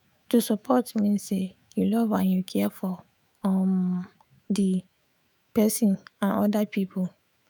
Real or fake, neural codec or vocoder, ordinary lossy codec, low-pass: fake; autoencoder, 48 kHz, 128 numbers a frame, DAC-VAE, trained on Japanese speech; none; none